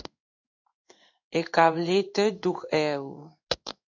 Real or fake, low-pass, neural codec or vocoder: fake; 7.2 kHz; codec, 16 kHz in and 24 kHz out, 1 kbps, XY-Tokenizer